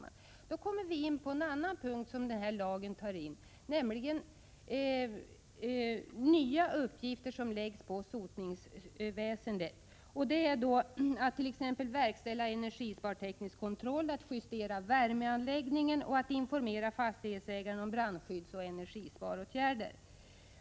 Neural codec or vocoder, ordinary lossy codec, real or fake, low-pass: none; none; real; none